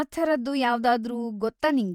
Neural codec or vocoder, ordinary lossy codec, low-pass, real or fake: vocoder, 48 kHz, 128 mel bands, Vocos; none; 19.8 kHz; fake